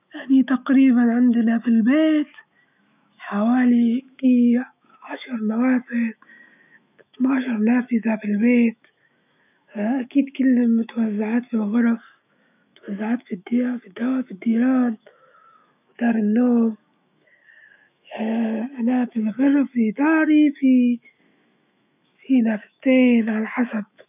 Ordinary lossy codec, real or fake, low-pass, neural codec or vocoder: none; fake; 3.6 kHz; autoencoder, 48 kHz, 128 numbers a frame, DAC-VAE, trained on Japanese speech